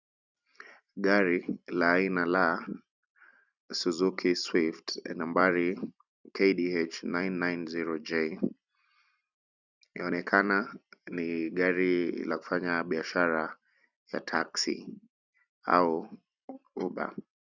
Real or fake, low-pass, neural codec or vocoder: fake; 7.2 kHz; vocoder, 44.1 kHz, 128 mel bands every 256 samples, BigVGAN v2